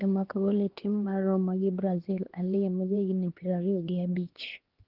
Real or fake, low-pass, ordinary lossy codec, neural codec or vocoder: fake; 5.4 kHz; Opus, 16 kbps; codec, 16 kHz, 2 kbps, X-Codec, HuBERT features, trained on LibriSpeech